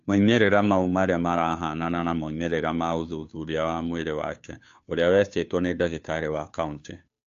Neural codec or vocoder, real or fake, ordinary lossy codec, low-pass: codec, 16 kHz, 2 kbps, FunCodec, trained on Chinese and English, 25 frames a second; fake; AAC, 64 kbps; 7.2 kHz